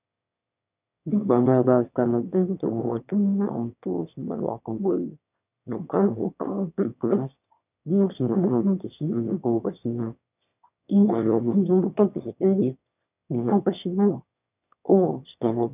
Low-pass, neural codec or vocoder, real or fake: 3.6 kHz; autoencoder, 22.05 kHz, a latent of 192 numbers a frame, VITS, trained on one speaker; fake